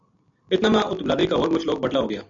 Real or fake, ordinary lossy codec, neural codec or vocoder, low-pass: real; MP3, 64 kbps; none; 7.2 kHz